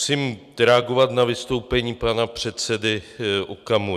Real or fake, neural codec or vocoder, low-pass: real; none; 14.4 kHz